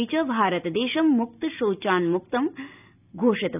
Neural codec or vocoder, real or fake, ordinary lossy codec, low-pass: none; real; none; 3.6 kHz